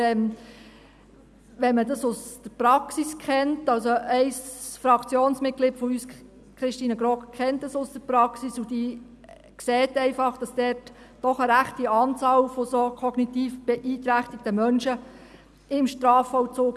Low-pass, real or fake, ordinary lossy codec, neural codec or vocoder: none; real; none; none